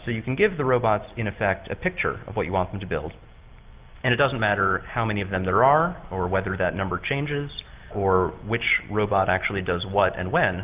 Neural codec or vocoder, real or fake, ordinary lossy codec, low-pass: none; real; Opus, 24 kbps; 3.6 kHz